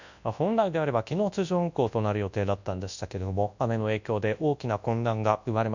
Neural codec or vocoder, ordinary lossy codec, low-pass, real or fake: codec, 24 kHz, 0.9 kbps, WavTokenizer, large speech release; none; 7.2 kHz; fake